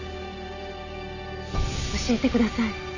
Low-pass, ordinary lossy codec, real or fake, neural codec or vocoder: 7.2 kHz; none; real; none